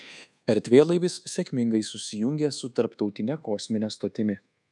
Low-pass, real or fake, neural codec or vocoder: 10.8 kHz; fake; codec, 24 kHz, 1.2 kbps, DualCodec